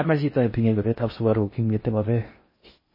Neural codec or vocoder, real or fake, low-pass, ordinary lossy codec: codec, 16 kHz in and 24 kHz out, 0.6 kbps, FocalCodec, streaming, 4096 codes; fake; 5.4 kHz; MP3, 24 kbps